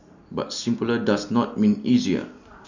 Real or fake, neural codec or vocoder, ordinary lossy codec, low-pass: real; none; none; 7.2 kHz